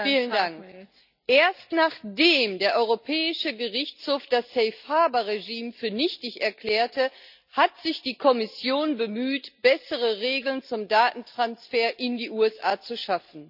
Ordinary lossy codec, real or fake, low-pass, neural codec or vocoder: none; real; 5.4 kHz; none